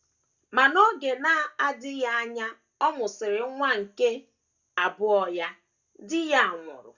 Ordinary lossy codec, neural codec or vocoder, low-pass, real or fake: Opus, 64 kbps; none; 7.2 kHz; real